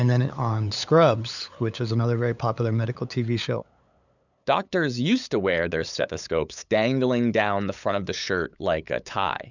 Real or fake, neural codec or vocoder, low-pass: fake; codec, 16 kHz, 8 kbps, FunCodec, trained on LibriTTS, 25 frames a second; 7.2 kHz